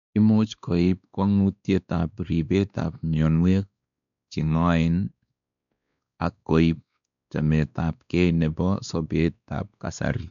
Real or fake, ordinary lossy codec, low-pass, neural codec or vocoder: fake; none; 7.2 kHz; codec, 16 kHz, 2 kbps, X-Codec, WavLM features, trained on Multilingual LibriSpeech